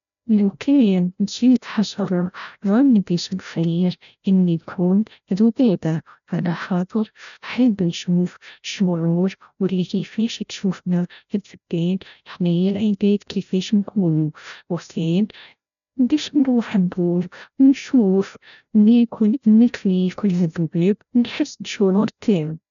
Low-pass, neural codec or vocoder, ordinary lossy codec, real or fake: 7.2 kHz; codec, 16 kHz, 0.5 kbps, FreqCodec, larger model; none; fake